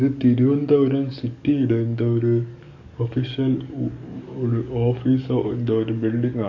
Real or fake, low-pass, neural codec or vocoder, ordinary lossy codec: fake; 7.2 kHz; codec, 44.1 kHz, 7.8 kbps, DAC; none